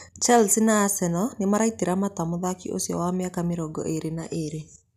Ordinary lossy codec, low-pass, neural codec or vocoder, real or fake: none; 14.4 kHz; none; real